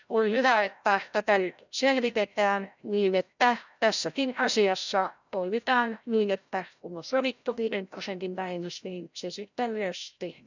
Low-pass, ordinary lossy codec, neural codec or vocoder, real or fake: 7.2 kHz; none; codec, 16 kHz, 0.5 kbps, FreqCodec, larger model; fake